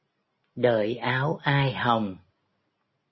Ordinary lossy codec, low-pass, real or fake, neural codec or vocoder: MP3, 24 kbps; 7.2 kHz; real; none